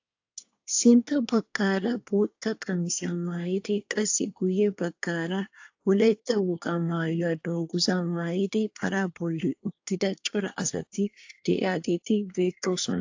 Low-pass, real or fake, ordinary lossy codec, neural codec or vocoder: 7.2 kHz; fake; AAC, 48 kbps; codec, 24 kHz, 1 kbps, SNAC